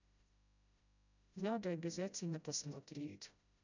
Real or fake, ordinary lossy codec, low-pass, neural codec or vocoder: fake; none; 7.2 kHz; codec, 16 kHz, 0.5 kbps, FreqCodec, smaller model